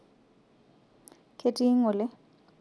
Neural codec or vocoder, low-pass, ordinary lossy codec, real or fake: none; none; none; real